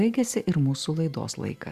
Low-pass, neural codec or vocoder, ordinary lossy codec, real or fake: 14.4 kHz; none; Opus, 64 kbps; real